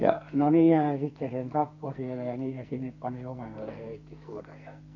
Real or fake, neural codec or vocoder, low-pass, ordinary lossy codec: fake; codec, 44.1 kHz, 2.6 kbps, SNAC; 7.2 kHz; none